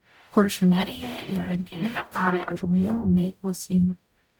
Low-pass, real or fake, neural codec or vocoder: 19.8 kHz; fake; codec, 44.1 kHz, 0.9 kbps, DAC